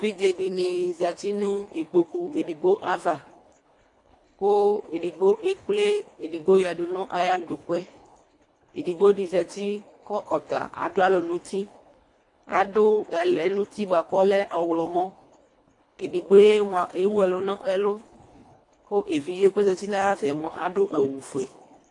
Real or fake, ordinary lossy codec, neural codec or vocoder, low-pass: fake; AAC, 48 kbps; codec, 24 kHz, 1.5 kbps, HILCodec; 10.8 kHz